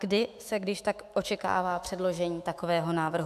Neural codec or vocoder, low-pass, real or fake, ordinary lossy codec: autoencoder, 48 kHz, 128 numbers a frame, DAC-VAE, trained on Japanese speech; 14.4 kHz; fake; AAC, 96 kbps